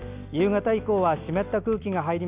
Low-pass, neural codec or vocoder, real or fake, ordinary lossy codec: 3.6 kHz; none; real; Opus, 64 kbps